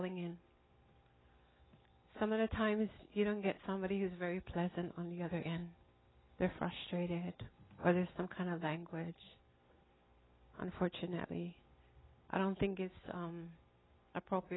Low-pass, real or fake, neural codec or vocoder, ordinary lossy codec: 7.2 kHz; real; none; AAC, 16 kbps